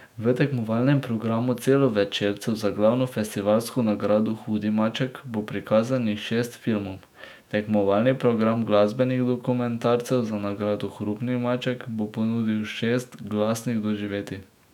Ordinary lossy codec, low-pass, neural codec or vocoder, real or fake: none; 19.8 kHz; autoencoder, 48 kHz, 128 numbers a frame, DAC-VAE, trained on Japanese speech; fake